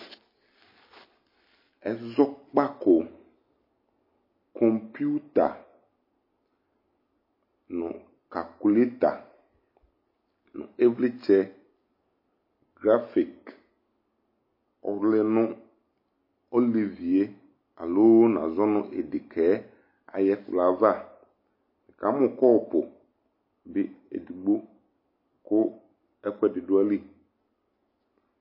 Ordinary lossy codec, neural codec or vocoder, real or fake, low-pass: MP3, 24 kbps; none; real; 5.4 kHz